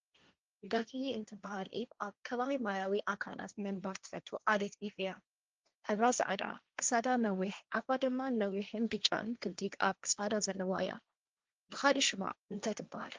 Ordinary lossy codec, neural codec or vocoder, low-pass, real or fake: Opus, 24 kbps; codec, 16 kHz, 1.1 kbps, Voila-Tokenizer; 7.2 kHz; fake